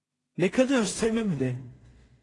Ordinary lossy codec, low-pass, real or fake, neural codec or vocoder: AAC, 32 kbps; 10.8 kHz; fake; codec, 16 kHz in and 24 kHz out, 0.4 kbps, LongCat-Audio-Codec, two codebook decoder